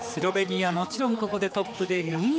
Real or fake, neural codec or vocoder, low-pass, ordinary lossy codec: fake; codec, 16 kHz, 4 kbps, X-Codec, HuBERT features, trained on general audio; none; none